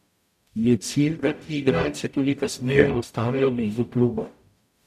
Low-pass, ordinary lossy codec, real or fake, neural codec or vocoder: 14.4 kHz; none; fake; codec, 44.1 kHz, 0.9 kbps, DAC